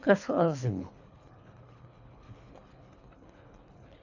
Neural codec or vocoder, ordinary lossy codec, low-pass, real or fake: codec, 24 kHz, 3 kbps, HILCodec; none; 7.2 kHz; fake